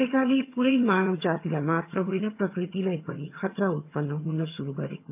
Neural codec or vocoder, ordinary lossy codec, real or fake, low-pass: vocoder, 22.05 kHz, 80 mel bands, HiFi-GAN; AAC, 32 kbps; fake; 3.6 kHz